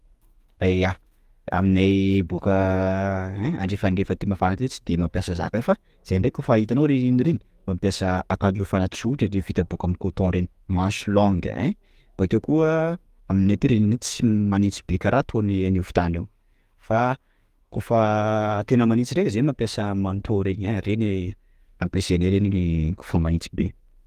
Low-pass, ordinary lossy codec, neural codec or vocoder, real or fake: 14.4 kHz; Opus, 32 kbps; codec, 44.1 kHz, 2.6 kbps, SNAC; fake